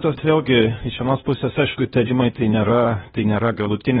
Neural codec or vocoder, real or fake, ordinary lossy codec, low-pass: codec, 16 kHz, 0.8 kbps, ZipCodec; fake; AAC, 16 kbps; 7.2 kHz